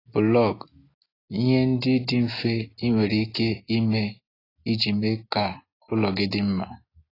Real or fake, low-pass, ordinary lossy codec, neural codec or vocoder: real; 5.4 kHz; AAC, 24 kbps; none